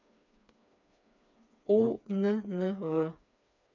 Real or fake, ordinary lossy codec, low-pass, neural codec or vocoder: fake; none; 7.2 kHz; codec, 16 kHz, 4 kbps, FreqCodec, smaller model